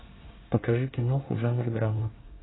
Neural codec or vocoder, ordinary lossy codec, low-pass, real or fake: codec, 24 kHz, 1 kbps, SNAC; AAC, 16 kbps; 7.2 kHz; fake